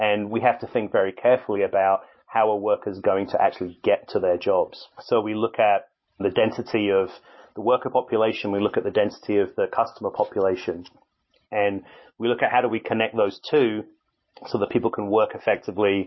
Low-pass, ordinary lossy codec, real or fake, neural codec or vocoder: 7.2 kHz; MP3, 24 kbps; real; none